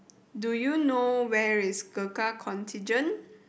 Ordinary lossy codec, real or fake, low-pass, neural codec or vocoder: none; real; none; none